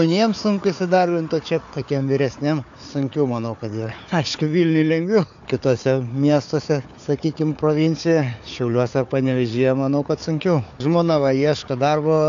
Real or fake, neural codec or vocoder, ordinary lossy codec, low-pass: fake; codec, 16 kHz, 4 kbps, FunCodec, trained on Chinese and English, 50 frames a second; AAC, 64 kbps; 7.2 kHz